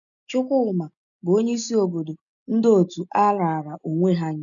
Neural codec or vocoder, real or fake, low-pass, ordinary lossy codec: none; real; 7.2 kHz; none